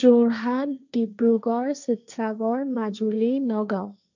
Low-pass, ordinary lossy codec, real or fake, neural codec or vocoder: 7.2 kHz; none; fake; codec, 16 kHz, 1.1 kbps, Voila-Tokenizer